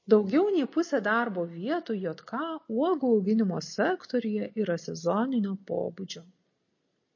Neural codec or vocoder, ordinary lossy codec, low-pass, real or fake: none; MP3, 32 kbps; 7.2 kHz; real